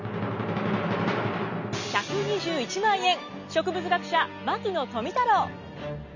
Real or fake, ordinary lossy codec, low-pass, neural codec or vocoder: real; none; 7.2 kHz; none